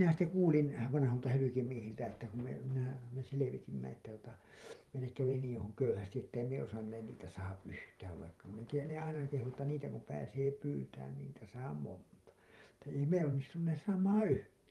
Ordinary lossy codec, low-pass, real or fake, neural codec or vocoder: Opus, 32 kbps; 19.8 kHz; fake; vocoder, 44.1 kHz, 128 mel bands every 512 samples, BigVGAN v2